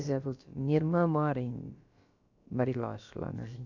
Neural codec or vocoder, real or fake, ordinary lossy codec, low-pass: codec, 16 kHz, about 1 kbps, DyCAST, with the encoder's durations; fake; none; 7.2 kHz